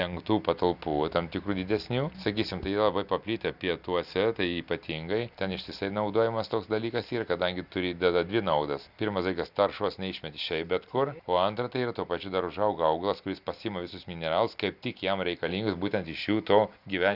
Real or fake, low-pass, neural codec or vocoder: real; 5.4 kHz; none